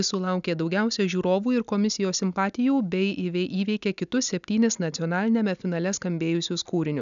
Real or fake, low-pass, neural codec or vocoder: real; 7.2 kHz; none